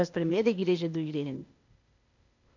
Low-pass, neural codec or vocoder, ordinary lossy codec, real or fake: 7.2 kHz; codec, 16 kHz, 0.8 kbps, ZipCodec; none; fake